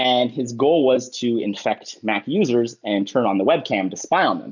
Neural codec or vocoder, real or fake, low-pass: none; real; 7.2 kHz